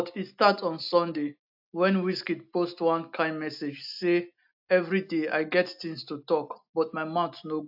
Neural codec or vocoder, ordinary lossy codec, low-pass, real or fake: none; AAC, 48 kbps; 5.4 kHz; real